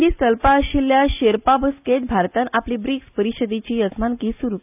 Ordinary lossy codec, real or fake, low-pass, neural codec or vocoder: none; real; 3.6 kHz; none